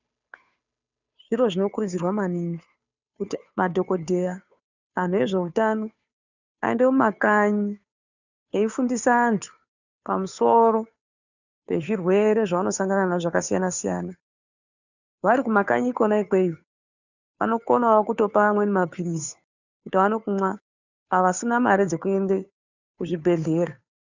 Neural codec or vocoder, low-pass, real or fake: codec, 16 kHz, 2 kbps, FunCodec, trained on Chinese and English, 25 frames a second; 7.2 kHz; fake